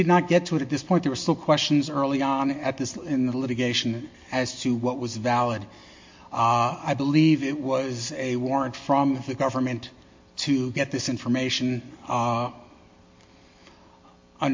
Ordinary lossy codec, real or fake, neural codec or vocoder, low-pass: MP3, 48 kbps; real; none; 7.2 kHz